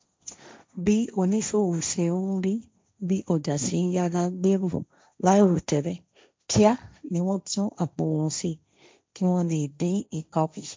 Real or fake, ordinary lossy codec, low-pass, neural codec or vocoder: fake; none; none; codec, 16 kHz, 1.1 kbps, Voila-Tokenizer